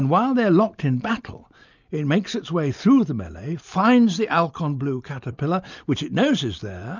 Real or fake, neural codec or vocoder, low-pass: real; none; 7.2 kHz